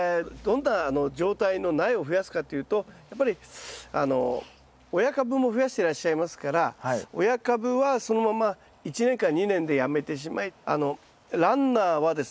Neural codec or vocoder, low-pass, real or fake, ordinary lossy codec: none; none; real; none